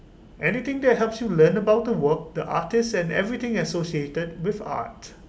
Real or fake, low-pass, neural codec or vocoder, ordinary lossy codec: real; none; none; none